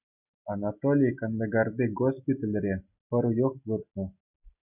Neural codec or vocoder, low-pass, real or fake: none; 3.6 kHz; real